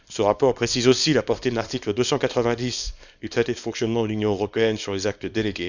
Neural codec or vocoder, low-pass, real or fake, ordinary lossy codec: codec, 24 kHz, 0.9 kbps, WavTokenizer, small release; 7.2 kHz; fake; none